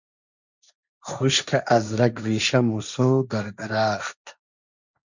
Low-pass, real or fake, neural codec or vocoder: 7.2 kHz; fake; codec, 16 kHz, 1.1 kbps, Voila-Tokenizer